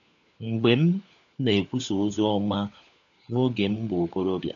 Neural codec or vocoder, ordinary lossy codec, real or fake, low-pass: codec, 16 kHz, 4 kbps, FunCodec, trained on LibriTTS, 50 frames a second; none; fake; 7.2 kHz